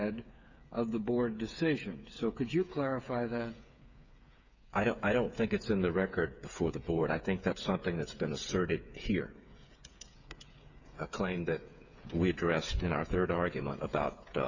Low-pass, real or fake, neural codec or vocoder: 7.2 kHz; fake; codec, 16 kHz, 8 kbps, FreqCodec, smaller model